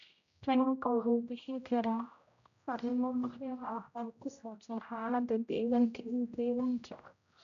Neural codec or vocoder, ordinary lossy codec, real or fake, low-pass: codec, 16 kHz, 0.5 kbps, X-Codec, HuBERT features, trained on general audio; none; fake; 7.2 kHz